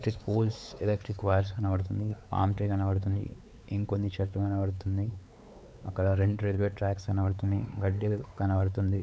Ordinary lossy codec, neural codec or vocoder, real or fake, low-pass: none; codec, 16 kHz, 4 kbps, X-Codec, WavLM features, trained on Multilingual LibriSpeech; fake; none